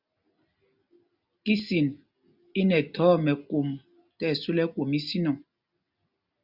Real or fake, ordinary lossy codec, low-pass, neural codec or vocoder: real; Opus, 64 kbps; 5.4 kHz; none